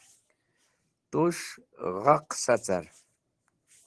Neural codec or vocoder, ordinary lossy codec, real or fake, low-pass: vocoder, 44.1 kHz, 128 mel bands every 512 samples, BigVGAN v2; Opus, 16 kbps; fake; 10.8 kHz